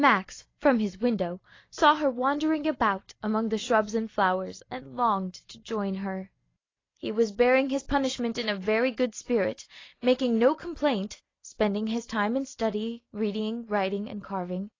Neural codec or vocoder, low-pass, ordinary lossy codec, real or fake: none; 7.2 kHz; AAC, 32 kbps; real